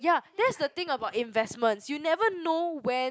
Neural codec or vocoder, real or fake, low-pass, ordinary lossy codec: none; real; none; none